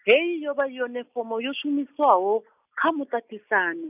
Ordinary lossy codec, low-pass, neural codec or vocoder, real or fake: none; 3.6 kHz; none; real